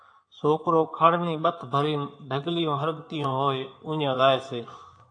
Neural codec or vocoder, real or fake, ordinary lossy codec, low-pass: codec, 16 kHz in and 24 kHz out, 2.2 kbps, FireRedTTS-2 codec; fake; AAC, 48 kbps; 9.9 kHz